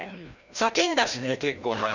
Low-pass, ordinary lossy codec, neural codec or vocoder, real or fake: 7.2 kHz; none; codec, 16 kHz, 1 kbps, FreqCodec, larger model; fake